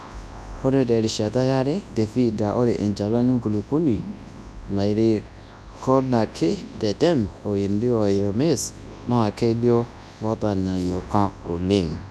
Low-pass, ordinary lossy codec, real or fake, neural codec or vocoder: none; none; fake; codec, 24 kHz, 0.9 kbps, WavTokenizer, large speech release